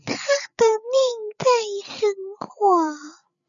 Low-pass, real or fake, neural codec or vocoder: 7.2 kHz; fake; codec, 16 kHz, 8 kbps, FreqCodec, larger model